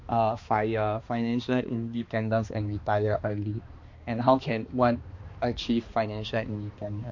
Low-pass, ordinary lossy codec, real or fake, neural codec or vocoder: 7.2 kHz; MP3, 48 kbps; fake; codec, 16 kHz, 2 kbps, X-Codec, HuBERT features, trained on balanced general audio